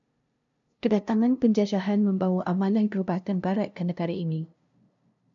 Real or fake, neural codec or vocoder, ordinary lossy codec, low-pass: fake; codec, 16 kHz, 0.5 kbps, FunCodec, trained on LibriTTS, 25 frames a second; MP3, 96 kbps; 7.2 kHz